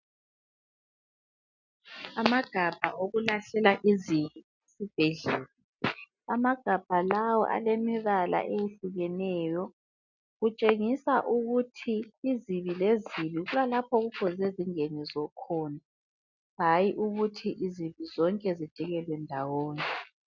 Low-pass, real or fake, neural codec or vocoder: 7.2 kHz; real; none